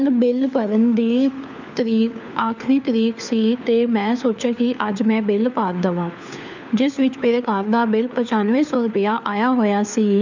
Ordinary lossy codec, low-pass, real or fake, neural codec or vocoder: none; 7.2 kHz; fake; codec, 16 kHz, 4 kbps, FunCodec, trained on LibriTTS, 50 frames a second